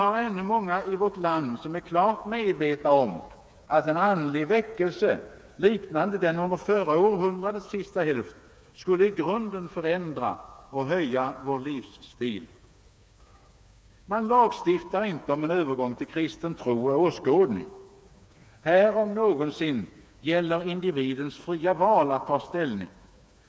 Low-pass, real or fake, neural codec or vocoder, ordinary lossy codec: none; fake; codec, 16 kHz, 4 kbps, FreqCodec, smaller model; none